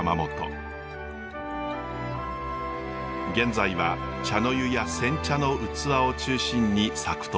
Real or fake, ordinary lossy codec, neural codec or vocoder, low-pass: real; none; none; none